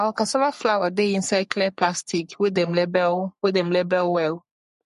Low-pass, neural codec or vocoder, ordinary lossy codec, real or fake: 14.4 kHz; codec, 44.1 kHz, 3.4 kbps, Pupu-Codec; MP3, 48 kbps; fake